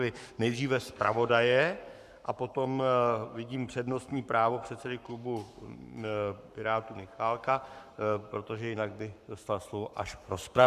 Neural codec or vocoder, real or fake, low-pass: codec, 44.1 kHz, 7.8 kbps, Pupu-Codec; fake; 14.4 kHz